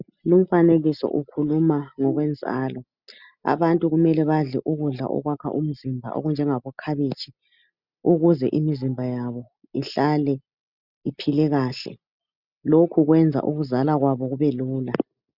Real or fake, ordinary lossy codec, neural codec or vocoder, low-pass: real; Opus, 64 kbps; none; 5.4 kHz